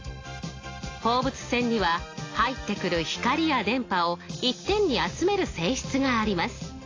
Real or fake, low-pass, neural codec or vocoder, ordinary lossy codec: real; 7.2 kHz; none; AAC, 32 kbps